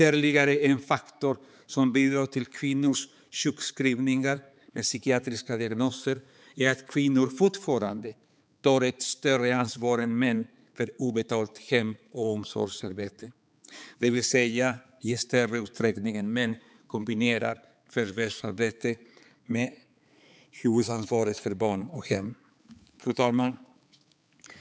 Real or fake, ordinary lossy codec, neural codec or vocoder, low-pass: fake; none; codec, 16 kHz, 4 kbps, X-Codec, HuBERT features, trained on balanced general audio; none